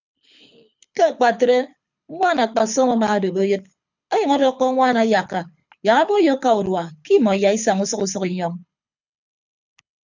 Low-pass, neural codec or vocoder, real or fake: 7.2 kHz; codec, 24 kHz, 6 kbps, HILCodec; fake